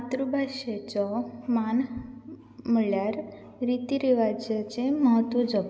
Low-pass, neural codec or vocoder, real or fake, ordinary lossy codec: none; none; real; none